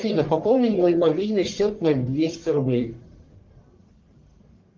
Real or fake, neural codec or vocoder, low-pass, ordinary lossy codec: fake; codec, 44.1 kHz, 1.7 kbps, Pupu-Codec; 7.2 kHz; Opus, 32 kbps